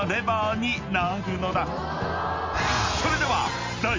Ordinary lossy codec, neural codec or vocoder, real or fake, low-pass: MP3, 48 kbps; none; real; 7.2 kHz